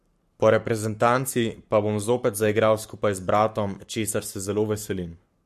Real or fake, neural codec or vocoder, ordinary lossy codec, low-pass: fake; codec, 44.1 kHz, 7.8 kbps, Pupu-Codec; MP3, 64 kbps; 14.4 kHz